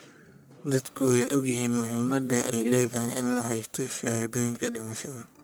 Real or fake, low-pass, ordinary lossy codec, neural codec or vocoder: fake; none; none; codec, 44.1 kHz, 1.7 kbps, Pupu-Codec